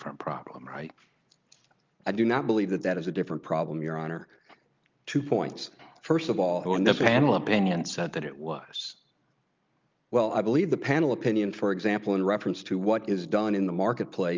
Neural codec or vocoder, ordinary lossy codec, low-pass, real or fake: none; Opus, 24 kbps; 7.2 kHz; real